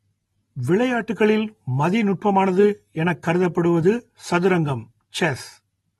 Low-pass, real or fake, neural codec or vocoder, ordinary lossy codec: 19.8 kHz; real; none; AAC, 32 kbps